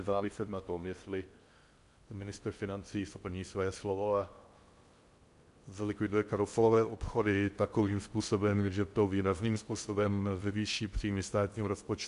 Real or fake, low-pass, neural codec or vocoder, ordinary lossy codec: fake; 10.8 kHz; codec, 16 kHz in and 24 kHz out, 0.6 kbps, FocalCodec, streaming, 4096 codes; MP3, 64 kbps